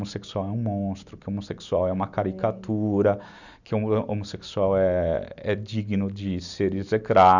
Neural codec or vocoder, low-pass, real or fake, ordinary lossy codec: none; 7.2 kHz; real; none